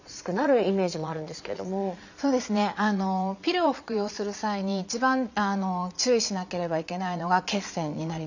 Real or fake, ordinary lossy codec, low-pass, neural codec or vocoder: fake; none; 7.2 kHz; vocoder, 22.05 kHz, 80 mel bands, Vocos